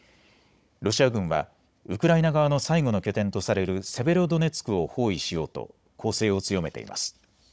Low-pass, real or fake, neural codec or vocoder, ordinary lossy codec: none; fake; codec, 16 kHz, 16 kbps, FunCodec, trained on Chinese and English, 50 frames a second; none